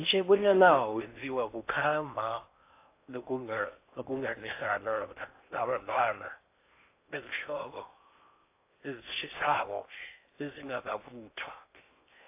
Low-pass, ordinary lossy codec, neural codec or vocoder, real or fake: 3.6 kHz; AAC, 24 kbps; codec, 16 kHz in and 24 kHz out, 0.6 kbps, FocalCodec, streaming, 4096 codes; fake